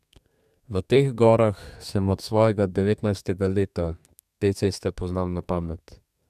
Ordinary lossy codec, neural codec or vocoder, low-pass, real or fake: none; codec, 32 kHz, 1.9 kbps, SNAC; 14.4 kHz; fake